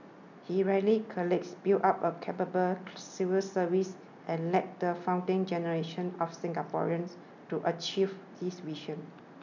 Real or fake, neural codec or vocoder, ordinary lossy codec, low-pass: real; none; none; 7.2 kHz